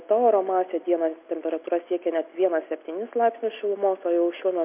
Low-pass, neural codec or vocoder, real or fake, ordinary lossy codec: 3.6 kHz; none; real; MP3, 32 kbps